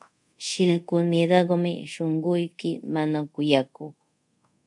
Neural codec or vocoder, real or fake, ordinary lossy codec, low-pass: codec, 24 kHz, 0.5 kbps, DualCodec; fake; MP3, 64 kbps; 10.8 kHz